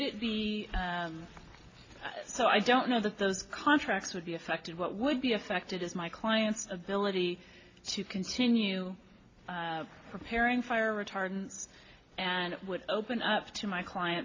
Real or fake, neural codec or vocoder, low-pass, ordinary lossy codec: real; none; 7.2 kHz; AAC, 32 kbps